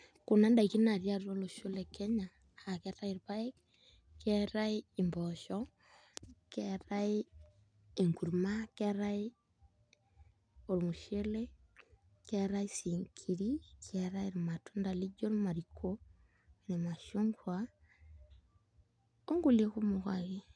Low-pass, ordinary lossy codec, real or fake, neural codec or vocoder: 9.9 kHz; none; real; none